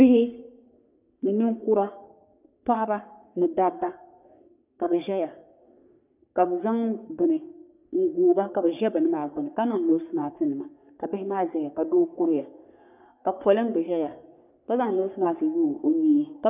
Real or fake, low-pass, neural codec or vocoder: fake; 3.6 kHz; codec, 44.1 kHz, 3.4 kbps, Pupu-Codec